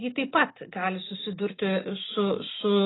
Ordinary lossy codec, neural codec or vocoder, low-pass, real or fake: AAC, 16 kbps; none; 7.2 kHz; real